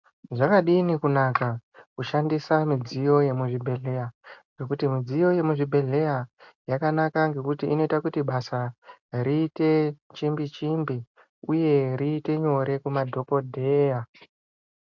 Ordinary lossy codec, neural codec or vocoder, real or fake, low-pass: MP3, 64 kbps; none; real; 7.2 kHz